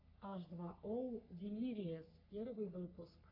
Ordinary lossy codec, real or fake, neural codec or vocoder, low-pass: AAC, 48 kbps; fake; codec, 44.1 kHz, 3.4 kbps, Pupu-Codec; 5.4 kHz